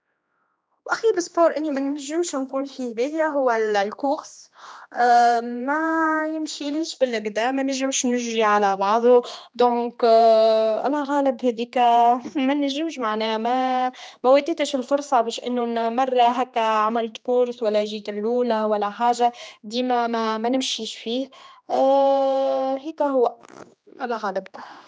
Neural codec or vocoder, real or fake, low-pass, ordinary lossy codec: codec, 16 kHz, 2 kbps, X-Codec, HuBERT features, trained on general audio; fake; none; none